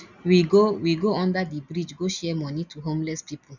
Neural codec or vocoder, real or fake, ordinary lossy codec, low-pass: none; real; none; 7.2 kHz